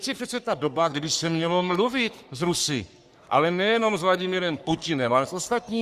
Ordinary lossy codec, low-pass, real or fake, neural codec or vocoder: Opus, 64 kbps; 14.4 kHz; fake; codec, 44.1 kHz, 3.4 kbps, Pupu-Codec